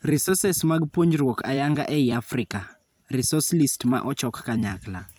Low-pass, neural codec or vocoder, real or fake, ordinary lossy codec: none; vocoder, 44.1 kHz, 128 mel bands every 256 samples, BigVGAN v2; fake; none